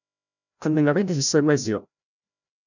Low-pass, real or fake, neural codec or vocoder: 7.2 kHz; fake; codec, 16 kHz, 0.5 kbps, FreqCodec, larger model